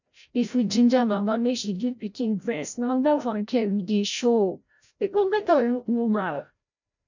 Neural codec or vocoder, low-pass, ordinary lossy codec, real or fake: codec, 16 kHz, 0.5 kbps, FreqCodec, larger model; 7.2 kHz; none; fake